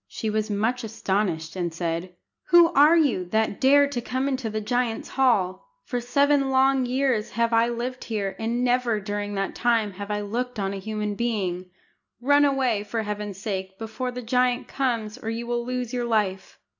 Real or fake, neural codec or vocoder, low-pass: real; none; 7.2 kHz